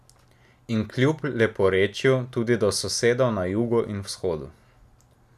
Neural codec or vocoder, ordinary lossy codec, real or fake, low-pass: none; none; real; 14.4 kHz